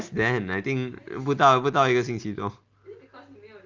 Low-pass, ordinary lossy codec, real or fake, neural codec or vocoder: 7.2 kHz; Opus, 32 kbps; real; none